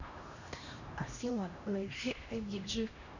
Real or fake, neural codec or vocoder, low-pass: fake; codec, 16 kHz, 1 kbps, X-Codec, HuBERT features, trained on LibriSpeech; 7.2 kHz